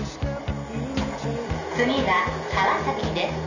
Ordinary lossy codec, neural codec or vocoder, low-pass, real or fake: none; none; 7.2 kHz; real